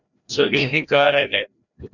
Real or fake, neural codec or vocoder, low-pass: fake; codec, 16 kHz, 1 kbps, FreqCodec, larger model; 7.2 kHz